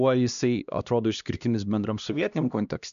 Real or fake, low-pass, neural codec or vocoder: fake; 7.2 kHz; codec, 16 kHz, 1 kbps, X-Codec, HuBERT features, trained on LibriSpeech